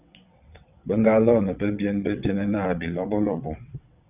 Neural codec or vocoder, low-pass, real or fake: vocoder, 22.05 kHz, 80 mel bands, WaveNeXt; 3.6 kHz; fake